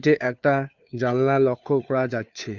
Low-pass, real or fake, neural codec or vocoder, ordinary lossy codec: 7.2 kHz; fake; codec, 16 kHz, 2 kbps, FunCodec, trained on Chinese and English, 25 frames a second; none